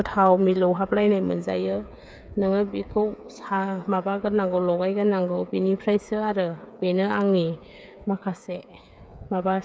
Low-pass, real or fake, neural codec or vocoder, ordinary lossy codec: none; fake; codec, 16 kHz, 8 kbps, FreqCodec, smaller model; none